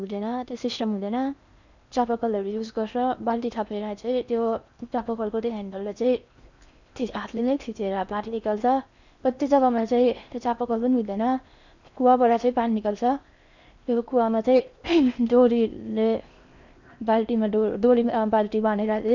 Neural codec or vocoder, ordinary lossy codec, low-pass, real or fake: codec, 16 kHz in and 24 kHz out, 0.8 kbps, FocalCodec, streaming, 65536 codes; none; 7.2 kHz; fake